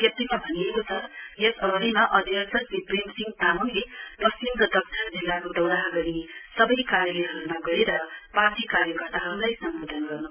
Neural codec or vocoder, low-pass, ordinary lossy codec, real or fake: none; 3.6 kHz; none; real